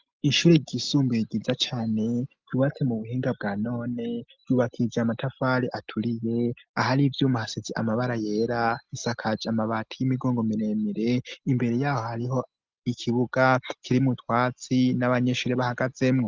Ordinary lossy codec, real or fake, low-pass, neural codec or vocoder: Opus, 24 kbps; real; 7.2 kHz; none